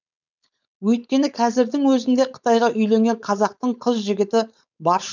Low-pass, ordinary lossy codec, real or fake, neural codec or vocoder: 7.2 kHz; none; fake; codec, 16 kHz, 4.8 kbps, FACodec